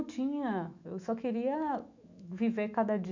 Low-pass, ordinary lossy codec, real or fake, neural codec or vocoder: 7.2 kHz; MP3, 64 kbps; fake; autoencoder, 48 kHz, 128 numbers a frame, DAC-VAE, trained on Japanese speech